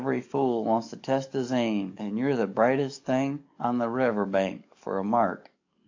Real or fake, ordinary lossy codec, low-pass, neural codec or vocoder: fake; AAC, 48 kbps; 7.2 kHz; codec, 44.1 kHz, 7.8 kbps, DAC